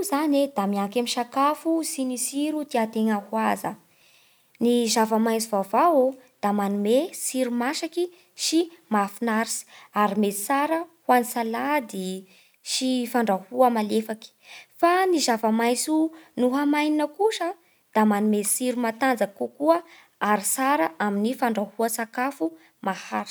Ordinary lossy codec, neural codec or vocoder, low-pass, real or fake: none; none; none; real